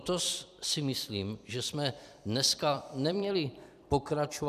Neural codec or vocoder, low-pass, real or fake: vocoder, 44.1 kHz, 128 mel bands every 256 samples, BigVGAN v2; 14.4 kHz; fake